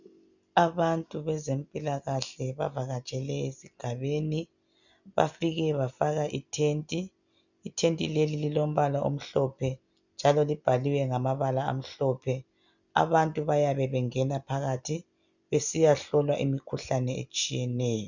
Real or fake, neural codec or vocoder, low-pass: real; none; 7.2 kHz